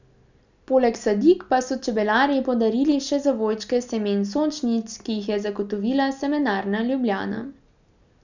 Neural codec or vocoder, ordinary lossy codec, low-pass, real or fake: none; none; 7.2 kHz; real